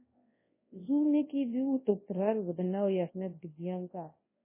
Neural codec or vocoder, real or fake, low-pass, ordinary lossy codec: codec, 24 kHz, 0.9 kbps, WavTokenizer, large speech release; fake; 3.6 kHz; MP3, 16 kbps